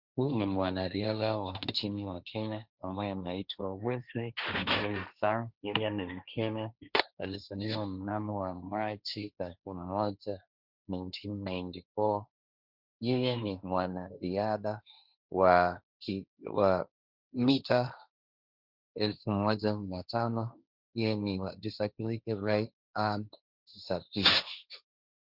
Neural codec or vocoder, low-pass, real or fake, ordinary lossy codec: codec, 16 kHz, 1.1 kbps, Voila-Tokenizer; 5.4 kHz; fake; Opus, 64 kbps